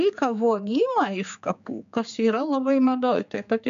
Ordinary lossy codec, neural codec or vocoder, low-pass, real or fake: MP3, 64 kbps; codec, 16 kHz, 4 kbps, X-Codec, HuBERT features, trained on general audio; 7.2 kHz; fake